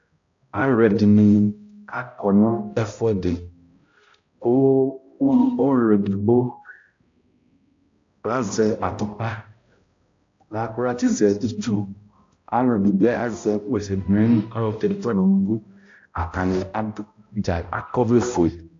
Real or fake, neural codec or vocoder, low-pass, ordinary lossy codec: fake; codec, 16 kHz, 0.5 kbps, X-Codec, HuBERT features, trained on balanced general audio; 7.2 kHz; AAC, 48 kbps